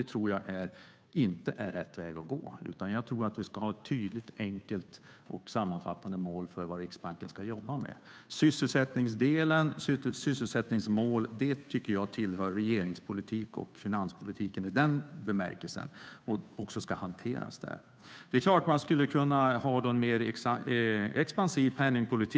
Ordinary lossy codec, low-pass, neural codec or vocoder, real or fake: none; none; codec, 16 kHz, 2 kbps, FunCodec, trained on Chinese and English, 25 frames a second; fake